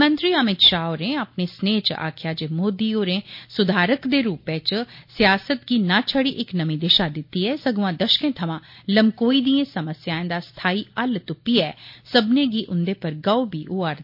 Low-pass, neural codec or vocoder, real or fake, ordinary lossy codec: 5.4 kHz; none; real; MP3, 32 kbps